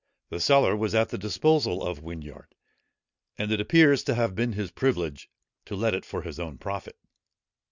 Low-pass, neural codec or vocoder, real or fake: 7.2 kHz; none; real